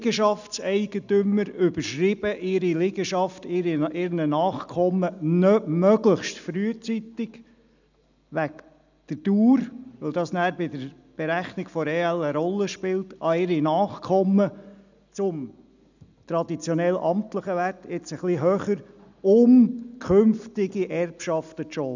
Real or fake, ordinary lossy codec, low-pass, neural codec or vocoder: real; none; 7.2 kHz; none